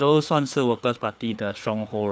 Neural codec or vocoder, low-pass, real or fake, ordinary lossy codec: codec, 16 kHz, 4 kbps, FreqCodec, larger model; none; fake; none